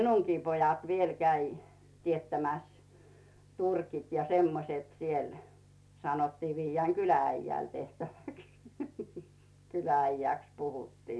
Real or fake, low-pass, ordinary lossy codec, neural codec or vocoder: real; none; none; none